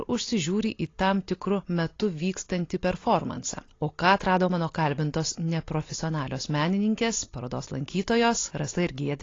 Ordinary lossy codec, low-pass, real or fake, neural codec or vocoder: AAC, 32 kbps; 7.2 kHz; real; none